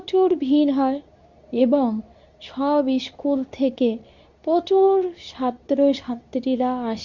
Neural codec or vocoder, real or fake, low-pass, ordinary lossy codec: codec, 24 kHz, 0.9 kbps, WavTokenizer, medium speech release version 2; fake; 7.2 kHz; none